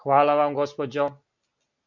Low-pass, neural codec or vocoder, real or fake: 7.2 kHz; none; real